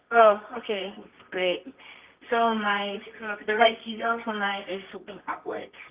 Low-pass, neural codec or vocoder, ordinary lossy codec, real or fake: 3.6 kHz; codec, 24 kHz, 0.9 kbps, WavTokenizer, medium music audio release; Opus, 24 kbps; fake